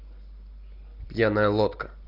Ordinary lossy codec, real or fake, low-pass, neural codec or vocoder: Opus, 32 kbps; real; 5.4 kHz; none